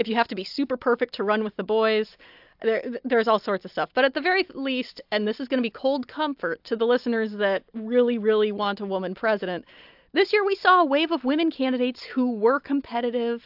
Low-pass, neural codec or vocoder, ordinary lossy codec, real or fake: 5.4 kHz; none; AAC, 48 kbps; real